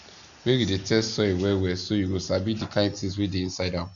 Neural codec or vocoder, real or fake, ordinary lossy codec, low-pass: none; real; none; 7.2 kHz